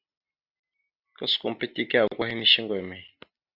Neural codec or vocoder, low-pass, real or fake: none; 5.4 kHz; real